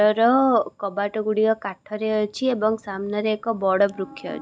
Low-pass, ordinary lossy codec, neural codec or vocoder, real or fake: none; none; none; real